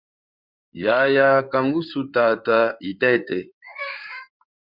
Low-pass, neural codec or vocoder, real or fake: 5.4 kHz; codec, 16 kHz in and 24 kHz out, 2.2 kbps, FireRedTTS-2 codec; fake